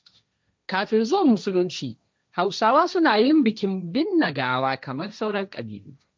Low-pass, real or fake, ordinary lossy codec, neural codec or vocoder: none; fake; none; codec, 16 kHz, 1.1 kbps, Voila-Tokenizer